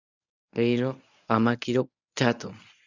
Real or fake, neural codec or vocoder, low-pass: fake; codec, 24 kHz, 0.9 kbps, WavTokenizer, medium speech release version 1; 7.2 kHz